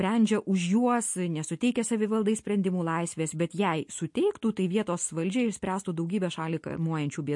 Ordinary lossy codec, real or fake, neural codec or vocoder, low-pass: MP3, 48 kbps; real; none; 10.8 kHz